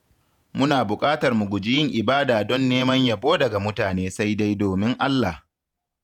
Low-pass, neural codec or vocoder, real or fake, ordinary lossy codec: 19.8 kHz; vocoder, 48 kHz, 128 mel bands, Vocos; fake; none